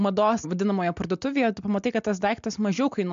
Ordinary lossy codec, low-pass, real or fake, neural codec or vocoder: MP3, 64 kbps; 7.2 kHz; real; none